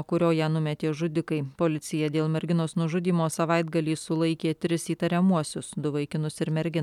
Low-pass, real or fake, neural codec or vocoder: 19.8 kHz; real; none